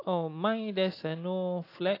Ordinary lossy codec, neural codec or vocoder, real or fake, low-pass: AAC, 32 kbps; none; real; 5.4 kHz